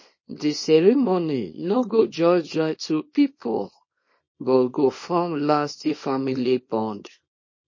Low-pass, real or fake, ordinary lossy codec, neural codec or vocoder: 7.2 kHz; fake; MP3, 32 kbps; codec, 24 kHz, 0.9 kbps, WavTokenizer, small release